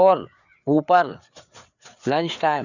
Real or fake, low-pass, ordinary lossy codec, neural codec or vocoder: fake; 7.2 kHz; none; codec, 16 kHz, 4 kbps, FreqCodec, larger model